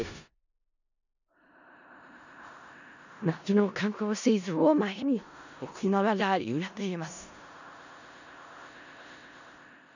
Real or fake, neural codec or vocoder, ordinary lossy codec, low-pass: fake; codec, 16 kHz in and 24 kHz out, 0.4 kbps, LongCat-Audio-Codec, four codebook decoder; none; 7.2 kHz